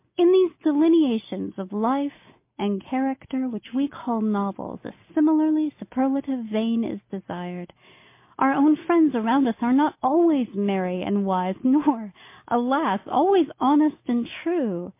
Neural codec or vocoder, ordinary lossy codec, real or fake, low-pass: none; MP3, 24 kbps; real; 3.6 kHz